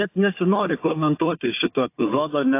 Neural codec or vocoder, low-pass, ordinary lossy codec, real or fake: codec, 16 kHz, 4 kbps, FunCodec, trained on Chinese and English, 50 frames a second; 3.6 kHz; AAC, 24 kbps; fake